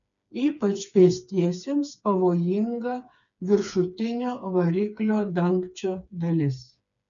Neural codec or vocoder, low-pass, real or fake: codec, 16 kHz, 4 kbps, FreqCodec, smaller model; 7.2 kHz; fake